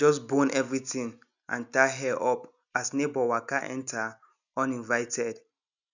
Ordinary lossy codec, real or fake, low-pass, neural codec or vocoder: none; real; 7.2 kHz; none